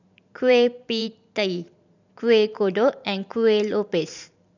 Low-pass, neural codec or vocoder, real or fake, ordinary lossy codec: 7.2 kHz; vocoder, 44.1 kHz, 128 mel bands every 512 samples, BigVGAN v2; fake; none